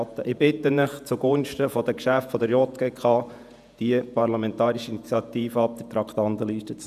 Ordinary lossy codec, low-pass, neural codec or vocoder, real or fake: none; 14.4 kHz; vocoder, 48 kHz, 128 mel bands, Vocos; fake